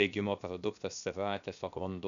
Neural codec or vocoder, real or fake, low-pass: codec, 16 kHz, 0.7 kbps, FocalCodec; fake; 7.2 kHz